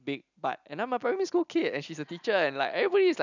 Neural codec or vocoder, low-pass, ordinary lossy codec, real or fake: none; 7.2 kHz; none; real